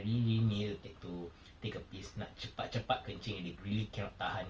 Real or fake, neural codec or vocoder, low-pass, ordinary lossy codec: real; none; 7.2 kHz; Opus, 24 kbps